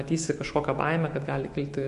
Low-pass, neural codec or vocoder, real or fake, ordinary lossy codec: 14.4 kHz; autoencoder, 48 kHz, 128 numbers a frame, DAC-VAE, trained on Japanese speech; fake; MP3, 48 kbps